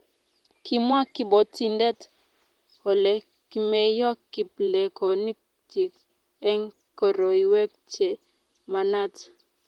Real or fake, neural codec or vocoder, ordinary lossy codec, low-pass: fake; vocoder, 44.1 kHz, 128 mel bands, Pupu-Vocoder; Opus, 24 kbps; 19.8 kHz